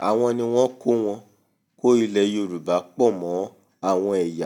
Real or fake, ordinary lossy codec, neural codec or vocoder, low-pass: real; none; none; 19.8 kHz